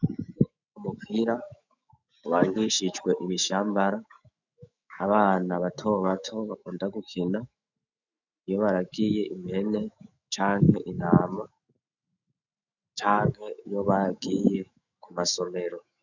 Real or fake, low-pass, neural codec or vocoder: fake; 7.2 kHz; autoencoder, 48 kHz, 128 numbers a frame, DAC-VAE, trained on Japanese speech